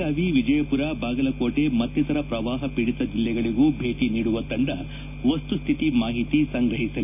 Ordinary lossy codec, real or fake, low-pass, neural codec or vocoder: none; real; 3.6 kHz; none